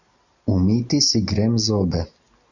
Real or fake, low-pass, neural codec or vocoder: real; 7.2 kHz; none